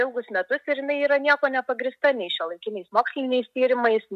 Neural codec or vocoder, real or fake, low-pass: none; real; 14.4 kHz